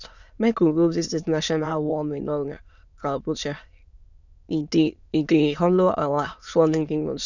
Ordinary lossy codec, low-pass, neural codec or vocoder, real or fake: none; 7.2 kHz; autoencoder, 22.05 kHz, a latent of 192 numbers a frame, VITS, trained on many speakers; fake